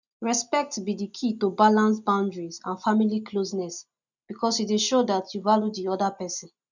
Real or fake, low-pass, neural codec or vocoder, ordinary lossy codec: real; 7.2 kHz; none; none